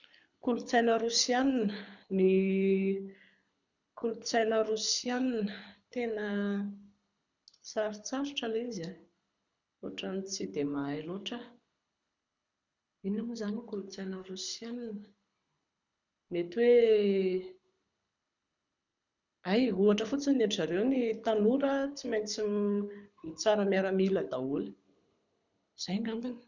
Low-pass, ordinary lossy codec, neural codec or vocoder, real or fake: 7.2 kHz; none; codec, 24 kHz, 6 kbps, HILCodec; fake